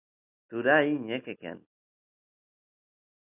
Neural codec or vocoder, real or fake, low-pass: none; real; 3.6 kHz